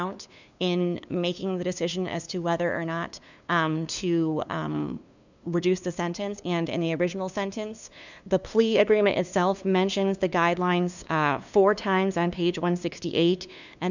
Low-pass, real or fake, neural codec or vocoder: 7.2 kHz; fake; codec, 16 kHz, 2 kbps, FunCodec, trained on LibriTTS, 25 frames a second